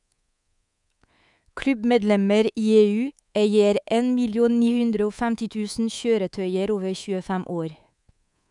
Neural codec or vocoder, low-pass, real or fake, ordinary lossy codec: codec, 24 kHz, 3.1 kbps, DualCodec; 10.8 kHz; fake; none